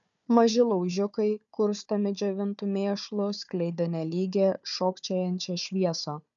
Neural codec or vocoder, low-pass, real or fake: codec, 16 kHz, 4 kbps, FunCodec, trained on Chinese and English, 50 frames a second; 7.2 kHz; fake